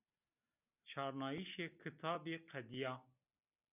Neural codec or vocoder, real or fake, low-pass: none; real; 3.6 kHz